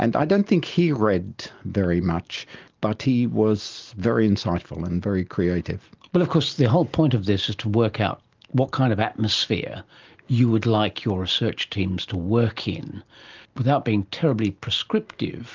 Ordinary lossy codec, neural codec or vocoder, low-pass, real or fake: Opus, 32 kbps; none; 7.2 kHz; real